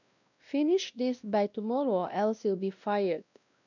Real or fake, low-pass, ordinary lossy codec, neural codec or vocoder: fake; 7.2 kHz; none; codec, 16 kHz, 1 kbps, X-Codec, WavLM features, trained on Multilingual LibriSpeech